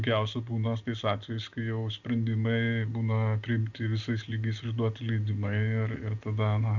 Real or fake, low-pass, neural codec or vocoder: real; 7.2 kHz; none